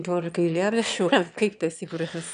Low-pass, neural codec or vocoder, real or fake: 9.9 kHz; autoencoder, 22.05 kHz, a latent of 192 numbers a frame, VITS, trained on one speaker; fake